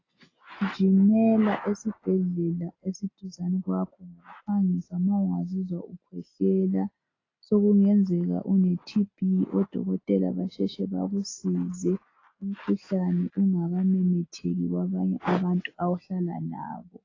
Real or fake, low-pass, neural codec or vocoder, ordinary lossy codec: real; 7.2 kHz; none; AAC, 32 kbps